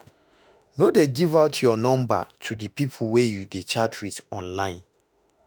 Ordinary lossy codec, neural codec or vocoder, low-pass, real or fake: none; autoencoder, 48 kHz, 32 numbers a frame, DAC-VAE, trained on Japanese speech; none; fake